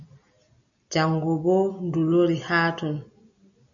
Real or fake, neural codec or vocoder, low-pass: real; none; 7.2 kHz